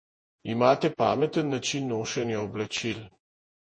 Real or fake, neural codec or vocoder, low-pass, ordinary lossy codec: fake; vocoder, 48 kHz, 128 mel bands, Vocos; 9.9 kHz; MP3, 32 kbps